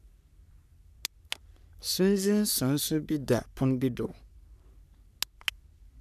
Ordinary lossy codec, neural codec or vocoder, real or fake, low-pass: none; codec, 44.1 kHz, 3.4 kbps, Pupu-Codec; fake; 14.4 kHz